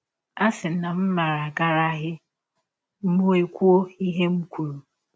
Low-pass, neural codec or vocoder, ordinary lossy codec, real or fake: none; none; none; real